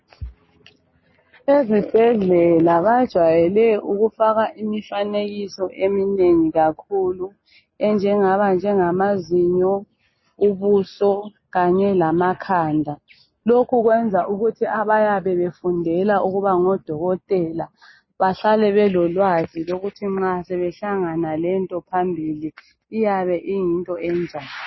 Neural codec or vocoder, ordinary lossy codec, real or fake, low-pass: none; MP3, 24 kbps; real; 7.2 kHz